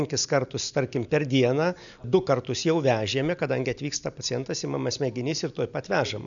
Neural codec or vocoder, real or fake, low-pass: none; real; 7.2 kHz